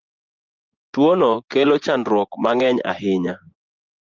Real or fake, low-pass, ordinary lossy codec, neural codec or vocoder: fake; 7.2 kHz; Opus, 32 kbps; vocoder, 24 kHz, 100 mel bands, Vocos